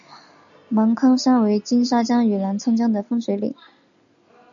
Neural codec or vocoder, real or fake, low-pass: none; real; 7.2 kHz